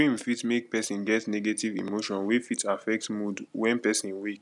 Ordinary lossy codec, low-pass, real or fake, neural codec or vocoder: none; 10.8 kHz; real; none